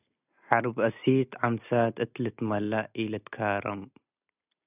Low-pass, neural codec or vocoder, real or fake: 3.6 kHz; none; real